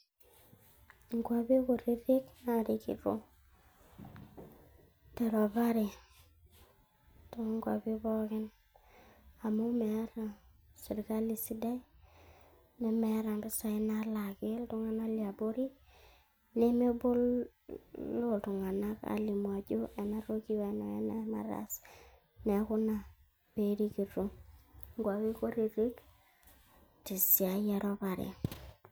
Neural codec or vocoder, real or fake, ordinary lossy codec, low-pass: none; real; none; none